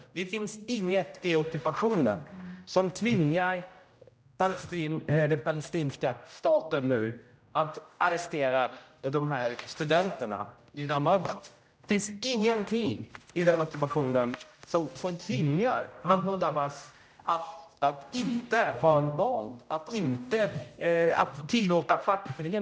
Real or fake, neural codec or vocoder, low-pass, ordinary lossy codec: fake; codec, 16 kHz, 0.5 kbps, X-Codec, HuBERT features, trained on general audio; none; none